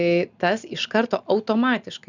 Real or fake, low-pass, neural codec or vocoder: real; 7.2 kHz; none